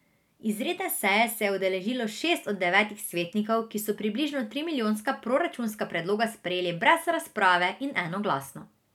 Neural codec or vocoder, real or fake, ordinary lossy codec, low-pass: none; real; none; 19.8 kHz